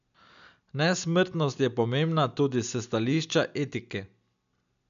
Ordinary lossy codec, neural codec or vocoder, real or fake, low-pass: none; none; real; 7.2 kHz